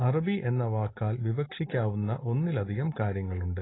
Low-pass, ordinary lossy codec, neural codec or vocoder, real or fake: 7.2 kHz; AAC, 16 kbps; none; real